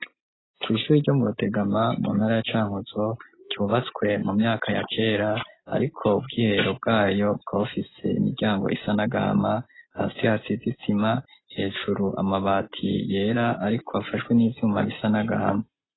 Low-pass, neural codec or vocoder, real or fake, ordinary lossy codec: 7.2 kHz; none; real; AAC, 16 kbps